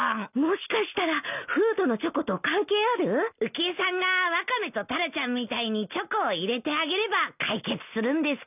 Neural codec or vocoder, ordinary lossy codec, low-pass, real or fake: none; none; 3.6 kHz; real